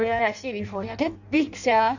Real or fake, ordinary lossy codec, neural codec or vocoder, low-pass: fake; none; codec, 16 kHz in and 24 kHz out, 0.6 kbps, FireRedTTS-2 codec; 7.2 kHz